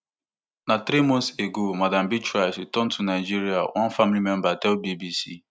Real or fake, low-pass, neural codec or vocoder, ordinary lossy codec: real; none; none; none